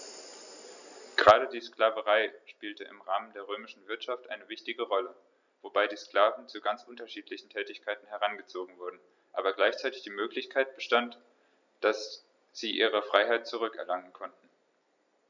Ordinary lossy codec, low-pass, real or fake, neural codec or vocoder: none; 7.2 kHz; real; none